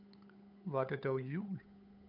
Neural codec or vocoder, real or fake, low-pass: codec, 16 kHz, 8 kbps, FunCodec, trained on Chinese and English, 25 frames a second; fake; 5.4 kHz